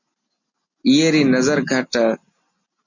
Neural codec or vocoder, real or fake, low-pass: none; real; 7.2 kHz